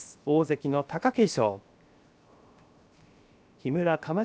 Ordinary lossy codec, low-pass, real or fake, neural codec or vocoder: none; none; fake; codec, 16 kHz, 0.7 kbps, FocalCodec